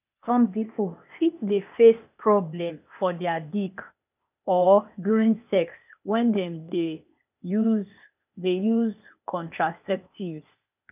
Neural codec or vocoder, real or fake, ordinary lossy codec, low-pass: codec, 16 kHz, 0.8 kbps, ZipCodec; fake; none; 3.6 kHz